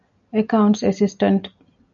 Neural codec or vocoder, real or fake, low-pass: none; real; 7.2 kHz